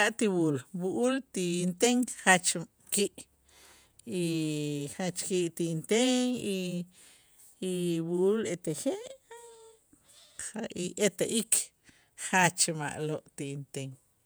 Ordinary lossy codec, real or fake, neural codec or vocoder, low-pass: none; fake; vocoder, 48 kHz, 128 mel bands, Vocos; none